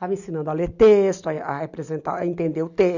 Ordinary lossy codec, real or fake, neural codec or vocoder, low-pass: none; real; none; 7.2 kHz